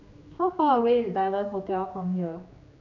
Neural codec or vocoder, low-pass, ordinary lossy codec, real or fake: codec, 16 kHz, 2 kbps, X-Codec, HuBERT features, trained on general audio; 7.2 kHz; none; fake